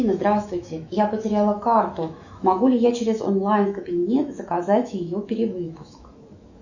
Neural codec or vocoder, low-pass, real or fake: autoencoder, 48 kHz, 128 numbers a frame, DAC-VAE, trained on Japanese speech; 7.2 kHz; fake